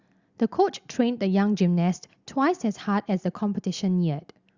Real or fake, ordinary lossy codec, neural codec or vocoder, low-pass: real; Opus, 64 kbps; none; 7.2 kHz